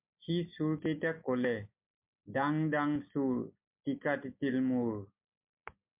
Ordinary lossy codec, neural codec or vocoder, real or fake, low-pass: MP3, 32 kbps; none; real; 3.6 kHz